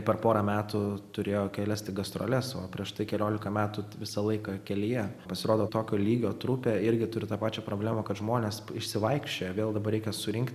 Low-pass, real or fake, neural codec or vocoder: 14.4 kHz; real; none